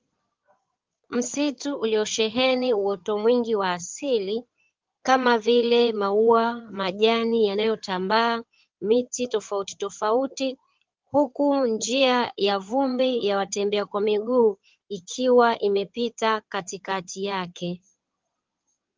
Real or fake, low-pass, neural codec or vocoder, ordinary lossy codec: fake; 7.2 kHz; codec, 16 kHz in and 24 kHz out, 2.2 kbps, FireRedTTS-2 codec; Opus, 32 kbps